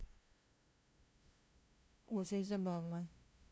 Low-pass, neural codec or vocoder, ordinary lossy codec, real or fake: none; codec, 16 kHz, 0.5 kbps, FunCodec, trained on LibriTTS, 25 frames a second; none; fake